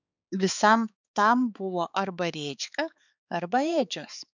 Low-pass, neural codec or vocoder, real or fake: 7.2 kHz; codec, 16 kHz, 4 kbps, X-Codec, HuBERT features, trained on balanced general audio; fake